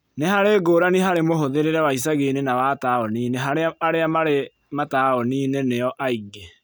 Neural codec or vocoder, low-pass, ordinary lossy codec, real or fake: none; none; none; real